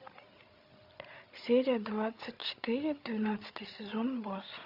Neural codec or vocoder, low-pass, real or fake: codec, 16 kHz, 16 kbps, FreqCodec, larger model; 5.4 kHz; fake